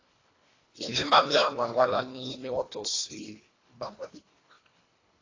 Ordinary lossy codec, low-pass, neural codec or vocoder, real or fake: AAC, 32 kbps; 7.2 kHz; codec, 24 kHz, 1.5 kbps, HILCodec; fake